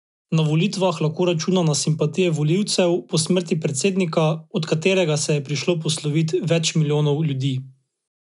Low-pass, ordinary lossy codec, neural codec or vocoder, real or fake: 10.8 kHz; none; none; real